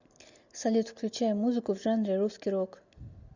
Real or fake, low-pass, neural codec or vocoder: real; 7.2 kHz; none